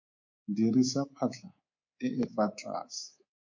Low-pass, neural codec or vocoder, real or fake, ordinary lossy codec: 7.2 kHz; codec, 24 kHz, 3.1 kbps, DualCodec; fake; MP3, 48 kbps